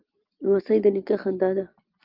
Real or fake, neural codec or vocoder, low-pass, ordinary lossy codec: real; none; 5.4 kHz; Opus, 24 kbps